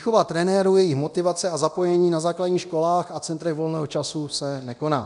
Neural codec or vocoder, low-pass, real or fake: codec, 24 kHz, 0.9 kbps, DualCodec; 10.8 kHz; fake